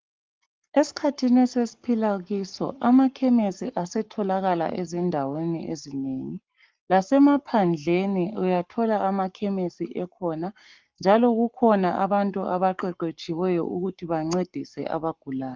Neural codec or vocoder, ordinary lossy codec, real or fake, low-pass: codec, 44.1 kHz, 7.8 kbps, Pupu-Codec; Opus, 24 kbps; fake; 7.2 kHz